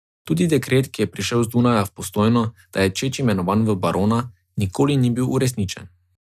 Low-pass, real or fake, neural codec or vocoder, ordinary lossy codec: 14.4 kHz; real; none; none